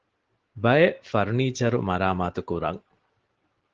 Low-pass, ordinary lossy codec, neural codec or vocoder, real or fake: 7.2 kHz; Opus, 16 kbps; none; real